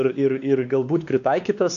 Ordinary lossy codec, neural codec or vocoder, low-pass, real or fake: MP3, 96 kbps; codec, 16 kHz, 2 kbps, X-Codec, WavLM features, trained on Multilingual LibriSpeech; 7.2 kHz; fake